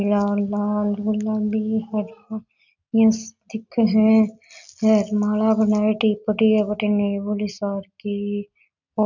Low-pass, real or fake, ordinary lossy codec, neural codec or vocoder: 7.2 kHz; real; none; none